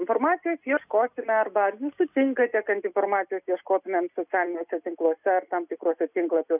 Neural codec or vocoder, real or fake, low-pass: none; real; 3.6 kHz